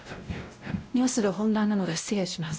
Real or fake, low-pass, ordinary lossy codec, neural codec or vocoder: fake; none; none; codec, 16 kHz, 0.5 kbps, X-Codec, WavLM features, trained on Multilingual LibriSpeech